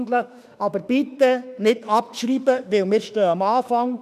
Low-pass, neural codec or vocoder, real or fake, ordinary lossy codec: 14.4 kHz; autoencoder, 48 kHz, 32 numbers a frame, DAC-VAE, trained on Japanese speech; fake; none